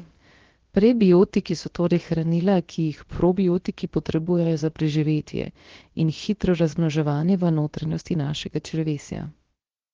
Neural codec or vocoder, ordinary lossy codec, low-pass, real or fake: codec, 16 kHz, about 1 kbps, DyCAST, with the encoder's durations; Opus, 16 kbps; 7.2 kHz; fake